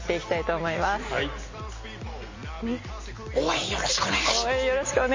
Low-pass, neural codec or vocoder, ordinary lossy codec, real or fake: 7.2 kHz; none; MP3, 32 kbps; real